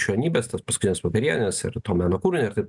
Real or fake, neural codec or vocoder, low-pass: real; none; 10.8 kHz